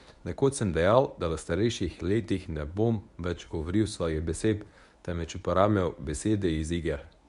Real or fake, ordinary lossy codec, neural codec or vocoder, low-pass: fake; none; codec, 24 kHz, 0.9 kbps, WavTokenizer, medium speech release version 1; 10.8 kHz